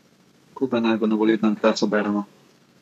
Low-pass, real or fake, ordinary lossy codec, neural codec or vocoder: 14.4 kHz; fake; none; codec, 32 kHz, 1.9 kbps, SNAC